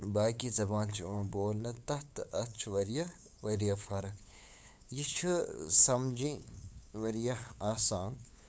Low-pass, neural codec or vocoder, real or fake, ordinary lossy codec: none; codec, 16 kHz, 8 kbps, FunCodec, trained on LibriTTS, 25 frames a second; fake; none